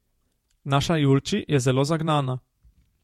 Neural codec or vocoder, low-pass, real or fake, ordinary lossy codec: vocoder, 48 kHz, 128 mel bands, Vocos; 19.8 kHz; fake; MP3, 64 kbps